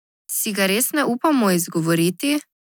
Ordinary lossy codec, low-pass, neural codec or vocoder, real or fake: none; none; none; real